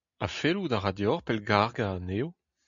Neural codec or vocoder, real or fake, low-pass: none; real; 7.2 kHz